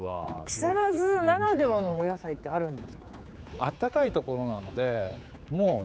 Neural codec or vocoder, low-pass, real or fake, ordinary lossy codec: codec, 16 kHz, 4 kbps, X-Codec, HuBERT features, trained on general audio; none; fake; none